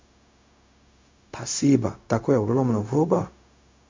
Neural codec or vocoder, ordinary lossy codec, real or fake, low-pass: codec, 16 kHz, 0.4 kbps, LongCat-Audio-Codec; MP3, 48 kbps; fake; 7.2 kHz